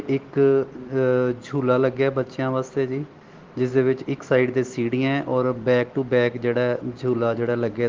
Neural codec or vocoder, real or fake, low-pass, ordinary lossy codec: none; real; 7.2 kHz; Opus, 16 kbps